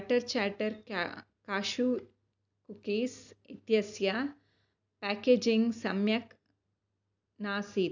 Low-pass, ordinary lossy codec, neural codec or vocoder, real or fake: 7.2 kHz; none; none; real